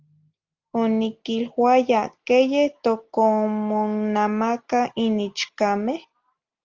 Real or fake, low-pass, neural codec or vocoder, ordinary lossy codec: real; 7.2 kHz; none; Opus, 32 kbps